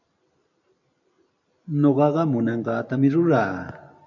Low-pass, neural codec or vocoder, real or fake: 7.2 kHz; vocoder, 44.1 kHz, 128 mel bands every 512 samples, BigVGAN v2; fake